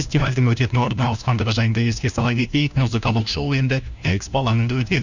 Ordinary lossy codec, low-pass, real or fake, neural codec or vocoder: none; 7.2 kHz; fake; codec, 16 kHz, 1 kbps, FunCodec, trained on LibriTTS, 50 frames a second